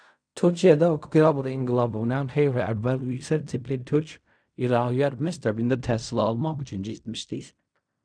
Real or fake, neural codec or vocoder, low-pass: fake; codec, 16 kHz in and 24 kHz out, 0.4 kbps, LongCat-Audio-Codec, fine tuned four codebook decoder; 9.9 kHz